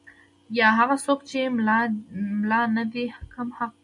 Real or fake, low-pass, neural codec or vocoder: real; 10.8 kHz; none